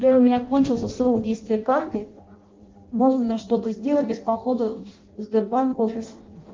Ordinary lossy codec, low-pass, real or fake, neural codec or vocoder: Opus, 32 kbps; 7.2 kHz; fake; codec, 16 kHz in and 24 kHz out, 0.6 kbps, FireRedTTS-2 codec